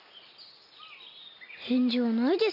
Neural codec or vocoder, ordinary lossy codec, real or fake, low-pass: none; none; real; 5.4 kHz